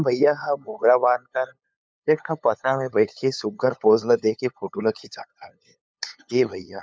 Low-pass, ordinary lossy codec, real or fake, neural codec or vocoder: none; none; fake; codec, 16 kHz, 8 kbps, FunCodec, trained on LibriTTS, 25 frames a second